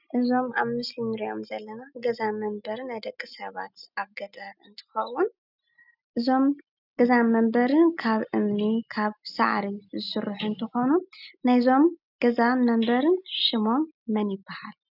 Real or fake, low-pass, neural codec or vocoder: real; 5.4 kHz; none